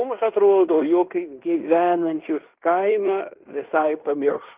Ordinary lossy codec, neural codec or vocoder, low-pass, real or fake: Opus, 24 kbps; codec, 16 kHz in and 24 kHz out, 0.9 kbps, LongCat-Audio-Codec, fine tuned four codebook decoder; 3.6 kHz; fake